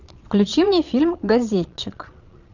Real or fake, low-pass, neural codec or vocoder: fake; 7.2 kHz; vocoder, 22.05 kHz, 80 mel bands, Vocos